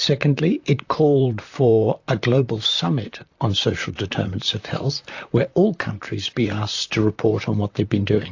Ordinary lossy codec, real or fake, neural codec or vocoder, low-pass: AAC, 48 kbps; fake; vocoder, 44.1 kHz, 128 mel bands, Pupu-Vocoder; 7.2 kHz